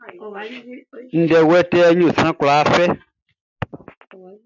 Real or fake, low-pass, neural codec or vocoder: real; 7.2 kHz; none